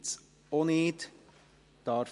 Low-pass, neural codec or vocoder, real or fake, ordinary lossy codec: 14.4 kHz; none; real; MP3, 48 kbps